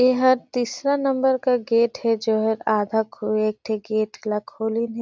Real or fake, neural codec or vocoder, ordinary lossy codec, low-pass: real; none; none; none